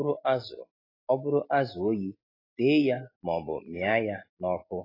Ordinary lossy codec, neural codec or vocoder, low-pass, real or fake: AAC, 24 kbps; none; 5.4 kHz; real